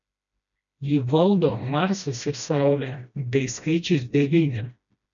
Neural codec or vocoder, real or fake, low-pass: codec, 16 kHz, 1 kbps, FreqCodec, smaller model; fake; 7.2 kHz